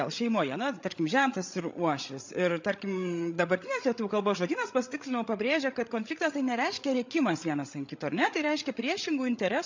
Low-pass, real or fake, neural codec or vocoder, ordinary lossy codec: 7.2 kHz; fake; codec, 16 kHz, 16 kbps, FreqCodec, larger model; MP3, 64 kbps